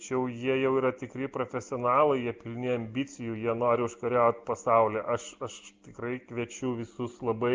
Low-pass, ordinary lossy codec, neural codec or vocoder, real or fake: 7.2 kHz; Opus, 24 kbps; none; real